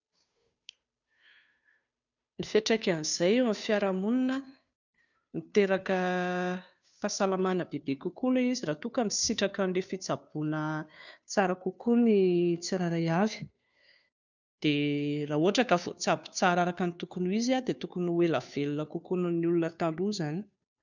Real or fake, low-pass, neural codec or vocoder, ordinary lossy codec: fake; 7.2 kHz; codec, 16 kHz, 2 kbps, FunCodec, trained on Chinese and English, 25 frames a second; none